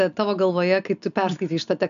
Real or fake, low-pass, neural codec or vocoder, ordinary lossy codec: real; 7.2 kHz; none; AAC, 96 kbps